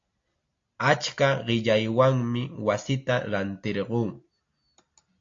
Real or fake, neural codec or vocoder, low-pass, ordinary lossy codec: real; none; 7.2 kHz; AAC, 48 kbps